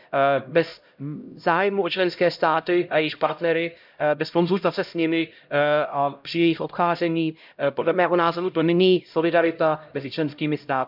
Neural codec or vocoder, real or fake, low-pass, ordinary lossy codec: codec, 16 kHz, 0.5 kbps, X-Codec, HuBERT features, trained on LibriSpeech; fake; 5.4 kHz; none